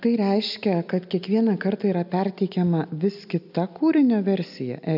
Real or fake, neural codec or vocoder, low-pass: real; none; 5.4 kHz